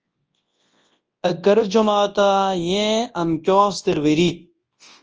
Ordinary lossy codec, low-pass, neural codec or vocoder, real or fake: Opus, 24 kbps; 7.2 kHz; codec, 24 kHz, 0.9 kbps, WavTokenizer, large speech release; fake